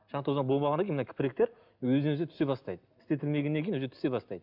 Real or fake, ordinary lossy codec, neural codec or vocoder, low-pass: real; none; none; 5.4 kHz